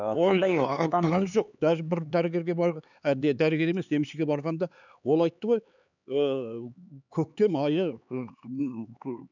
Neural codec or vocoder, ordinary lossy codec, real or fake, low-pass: codec, 16 kHz, 4 kbps, X-Codec, HuBERT features, trained on LibriSpeech; none; fake; 7.2 kHz